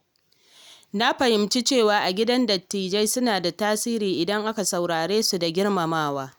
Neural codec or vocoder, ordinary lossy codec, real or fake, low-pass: none; none; real; none